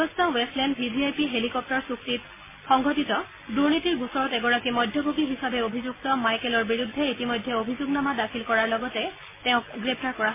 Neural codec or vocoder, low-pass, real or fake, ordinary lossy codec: none; 3.6 kHz; real; MP3, 16 kbps